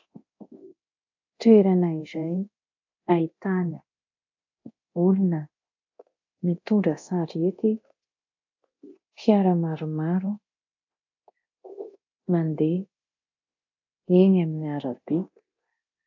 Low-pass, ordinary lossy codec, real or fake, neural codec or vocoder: 7.2 kHz; AAC, 48 kbps; fake; codec, 24 kHz, 0.9 kbps, DualCodec